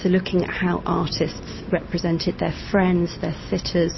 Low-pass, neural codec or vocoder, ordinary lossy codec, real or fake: 7.2 kHz; none; MP3, 24 kbps; real